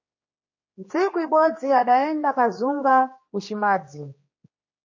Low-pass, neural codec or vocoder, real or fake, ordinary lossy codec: 7.2 kHz; codec, 16 kHz, 2 kbps, X-Codec, HuBERT features, trained on general audio; fake; MP3, 32 kbps